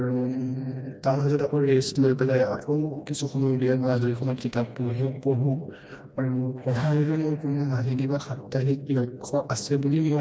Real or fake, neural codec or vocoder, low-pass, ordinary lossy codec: fake; codec, 16 kHz, 1 kbps, FreqCodec, smaller model; none; none